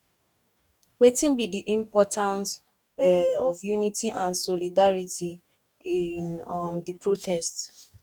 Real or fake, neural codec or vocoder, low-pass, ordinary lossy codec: fake; codec, 44.1 kHz, 2.6 kbps, DAC; 19.8 kHz; none